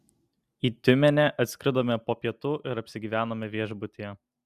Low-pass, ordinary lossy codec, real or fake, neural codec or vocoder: 14.4 kHz; Opus, 64 kbps; real; none